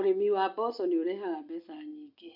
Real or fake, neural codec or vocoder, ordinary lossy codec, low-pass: real; none; none; 5.4 kHz